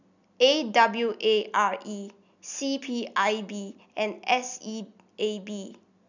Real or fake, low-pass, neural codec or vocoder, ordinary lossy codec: real; 7.2 kHz; none; none